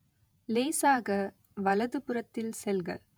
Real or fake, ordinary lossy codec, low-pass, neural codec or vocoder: fake; none; none; vocoder, 48 kHz, 128 mel bands, Vocos